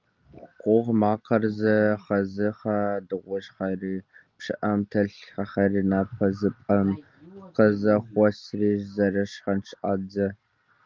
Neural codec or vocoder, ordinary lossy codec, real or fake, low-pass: none; Opus, 24 kbps; real; 7.2 kHz